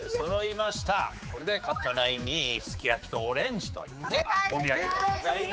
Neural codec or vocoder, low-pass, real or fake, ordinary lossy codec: codec, 16 kHz, 4 kbps, X-Codec, HuBERT features, trained on general audio; none; fake; none